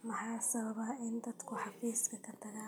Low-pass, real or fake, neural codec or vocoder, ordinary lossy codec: none; real; none; none